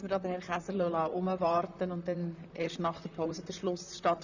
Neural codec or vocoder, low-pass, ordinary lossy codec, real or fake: vocoder, 44.1 kHz, 128 mel bands, Pupu-Vocoder; 7.2 kHz; none; fake